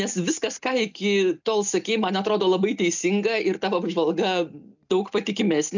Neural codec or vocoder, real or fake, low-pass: none; real; 7.2 kHz